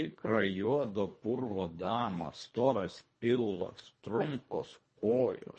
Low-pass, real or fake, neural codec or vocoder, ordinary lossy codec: 10.8 kHz; fake; codec, 24 kHz, 1.5 kbps, HILCodec; MP3, 32 kbps